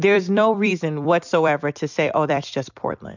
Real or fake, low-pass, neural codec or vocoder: fake; 7.2 kHz; vocoder, 44.1 kHz, 128 mel bands every 256 samples, BigVGAN v2